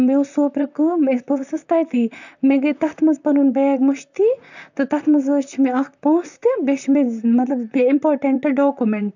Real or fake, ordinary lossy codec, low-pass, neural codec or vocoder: fake; none; 7.2 kHz; vocoder, 44.1 kHz, 128 mel bands, Pupu-Vocoder